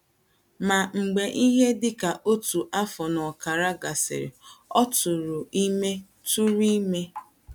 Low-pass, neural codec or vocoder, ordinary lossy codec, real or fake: none; none; none; real